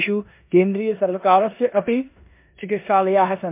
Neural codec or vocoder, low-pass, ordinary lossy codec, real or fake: codec, 16 kHz in and 24 kHz out, 0.9 kbps, LongCat-Audio-Codec, four codebook decoder; 3.6 kHz; MP3, 32 kbps; fake